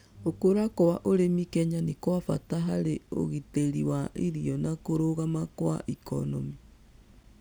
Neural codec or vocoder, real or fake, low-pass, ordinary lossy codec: none; real; none; none